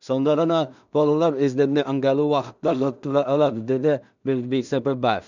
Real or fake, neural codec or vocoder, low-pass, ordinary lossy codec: fake; codec, 16 kHz in and 24 kHz out, 0.4 kbps, LongCat-Audio-Codec, two codebook decoder; 7.2 kHz; none